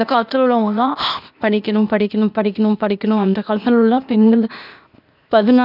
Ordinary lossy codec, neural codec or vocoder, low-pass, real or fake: none; codec, 16 kHz, 0.8 kbps, ZipCodec; 5.4 kHz; fake